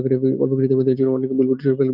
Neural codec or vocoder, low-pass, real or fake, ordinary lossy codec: none; 5.4 kHz; real; Opus, 24 kbps